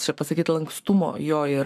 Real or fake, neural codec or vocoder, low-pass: fake; codec, 44.1 kHz, 7.8 kbps, Pupu-Codec; 14.4 kHz